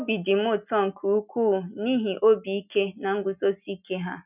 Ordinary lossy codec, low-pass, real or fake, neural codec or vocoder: none; 3.6 kHz; real; none